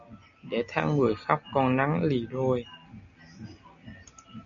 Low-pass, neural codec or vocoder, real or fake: 7.2 kHz; none; real